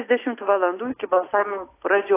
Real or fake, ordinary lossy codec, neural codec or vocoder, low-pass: real; AAC, 24 kbps; none; 3.6 kHz